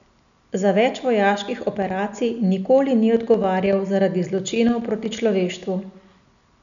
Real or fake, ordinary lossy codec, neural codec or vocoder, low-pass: real; none; none; 7.2 kHz